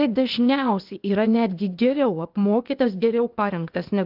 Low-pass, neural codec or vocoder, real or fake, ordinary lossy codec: 5.4 kHz; codec, 16 kHz, 0.8 kbps, ZipCodec; fake; Opus, 24 kbps